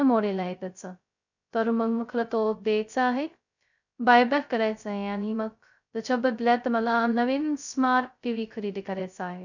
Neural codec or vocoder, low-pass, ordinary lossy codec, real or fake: codec, 16 kHz, 0.2 kbps, FocalCodec; 7.2 kHz; none; fake